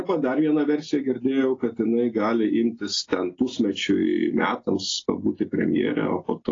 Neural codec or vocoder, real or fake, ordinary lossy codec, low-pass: none; real; AAC, 32 kbps; 7.2 kHz